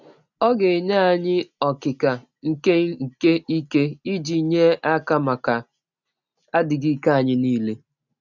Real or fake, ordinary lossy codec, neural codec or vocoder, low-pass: real; none; none; 7.2 kHz